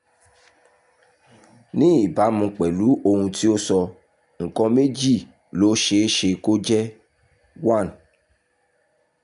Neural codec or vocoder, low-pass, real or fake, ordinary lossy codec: none; 10.8 kHz; real; none